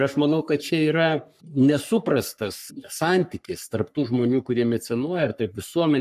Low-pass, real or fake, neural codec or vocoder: 14.4 kHz; fake; codec, 44.1 kHz, 3.4 kbps, Pupu-Codec